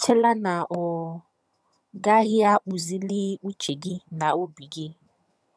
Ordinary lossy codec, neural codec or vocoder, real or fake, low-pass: none; vocoder, 22.05 kHz, 80 mel bands, HiFi-GAN; fake; none